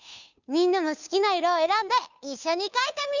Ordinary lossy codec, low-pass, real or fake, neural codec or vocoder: none; 7.2 kHz; fake; codec, 16 kHz, 2 kbps, FunCodec, trained on Chinese and English, 25 frames a second